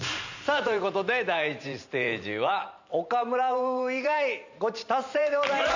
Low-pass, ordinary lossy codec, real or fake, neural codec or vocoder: 7.2 kHz; none; fake; vocoder, 44.1 kHz, 128 mel bands every 512 samples, BigVGAN v2